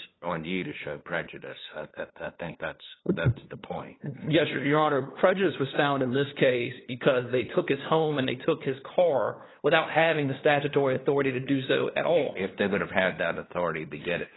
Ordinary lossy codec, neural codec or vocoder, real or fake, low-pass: AAC, 16 kbps; codec, 16 kHz, 2 kbps, FunCodec, trained on LibriTTS, 25 frames a second; fake; 7.2 kHz